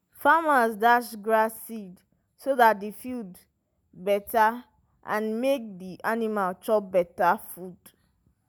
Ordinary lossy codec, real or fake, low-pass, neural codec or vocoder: none; real; none; none